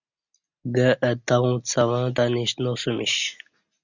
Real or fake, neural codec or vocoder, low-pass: real; none; 7.2 kHz